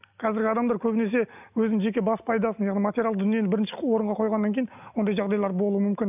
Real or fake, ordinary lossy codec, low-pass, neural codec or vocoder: real; none; 3.6 kHz; none